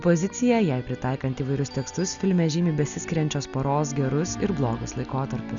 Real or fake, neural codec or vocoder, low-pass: real; none; 7.2 kHz